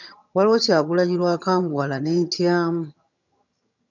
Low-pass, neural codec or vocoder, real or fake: 7.2 kHz; vocoder, 22.05 kHz, 80 mel bands, HiFi-GAN; fake